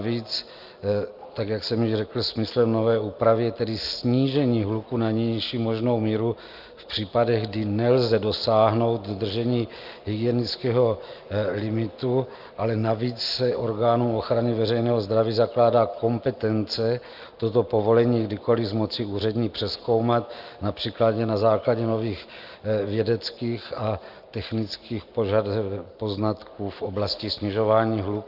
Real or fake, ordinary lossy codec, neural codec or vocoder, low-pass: real; Opus, 24 kbps; none; 5.4 kHz